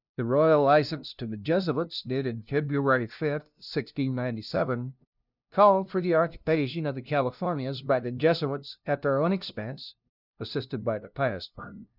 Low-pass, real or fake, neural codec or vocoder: 5.4 kHz; fake; codec, 16 kHz, 0.5 kbps, FunCodec, trained on LibriTTS, 25 frames a second